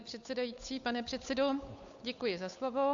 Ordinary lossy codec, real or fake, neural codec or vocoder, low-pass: AAC, 64 kbps; fake; codec, 16 kHz, 8 kbps, FunCodec, trained on Chinese and English, 25 frames a second; 7.2 kHz